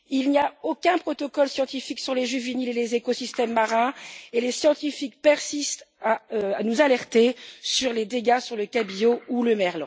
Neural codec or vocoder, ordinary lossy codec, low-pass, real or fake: none; none; none; real